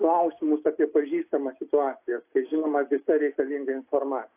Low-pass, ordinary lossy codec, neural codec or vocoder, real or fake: 3.6 kHz; AAC, 24 kbps; none; real